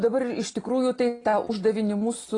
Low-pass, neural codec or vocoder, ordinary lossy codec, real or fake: 10.8 kHz; none; AAC, 32 kbps; real